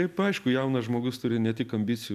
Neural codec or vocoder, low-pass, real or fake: none; 14.4 kHz; real